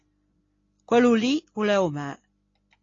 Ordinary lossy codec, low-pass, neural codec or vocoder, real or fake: AAC, 32 kbps; 7.2 kHz; none; real